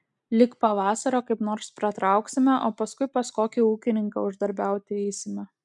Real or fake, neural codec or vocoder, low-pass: real; none; 10.8 kHz